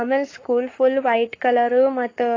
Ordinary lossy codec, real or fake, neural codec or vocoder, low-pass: AAC, 32 kbps; fake; codec, 44.1 kHz, 7.8 kbps, Pupu-Codec; 7.2 kHz